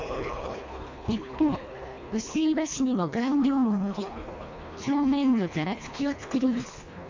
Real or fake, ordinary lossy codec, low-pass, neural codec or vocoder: fake; MP3, 48 kbps; 7.2 kHz; codec, 24 kHz, 1.5 kbps, HILCodec